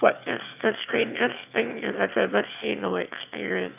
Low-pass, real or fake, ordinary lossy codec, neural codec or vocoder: 3.6 kHz; fake; none; autoencoder, 22.05 kHz, a latent of 192 numbers a frame, VITS, trained on one speaker